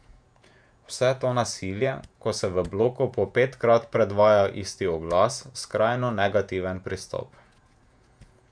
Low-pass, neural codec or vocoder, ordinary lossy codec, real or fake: 9.9 kHz; vocoder, 48 kHz, 128 mel bands, Vocos; none; fake